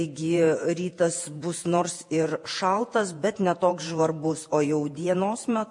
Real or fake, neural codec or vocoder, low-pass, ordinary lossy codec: fake; vocoder, 48 kHz, 128 mel bands, Vocos; 10.8 kHz; MP3, 48 kbps